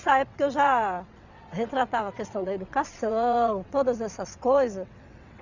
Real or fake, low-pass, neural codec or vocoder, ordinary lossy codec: fake; 7.2 kHz; vocoder, 22.05 kHz, 80 mel bands, WaveNeXt; none